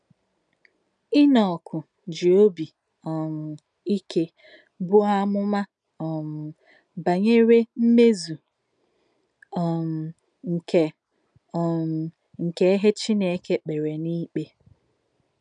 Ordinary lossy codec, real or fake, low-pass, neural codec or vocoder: none; real; 9.9 kHz; none